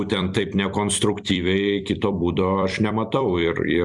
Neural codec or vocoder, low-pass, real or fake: vocoder, 44.1 kHz, 128 mel bands every 256 samples, BigVGAN v2; 10.8 kHz; fake